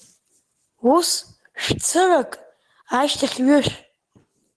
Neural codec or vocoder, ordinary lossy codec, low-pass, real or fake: none; Opus, 16 kbps; 10.8 kHz; real